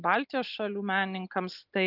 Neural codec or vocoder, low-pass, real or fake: none; 5.4 kHz; real